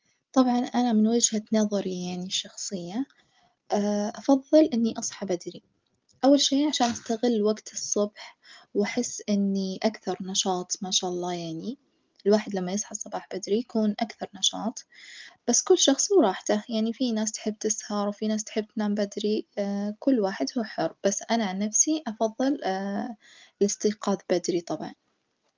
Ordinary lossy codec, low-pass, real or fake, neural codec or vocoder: Opus, 32 kbps; 7.2 kHz; real; none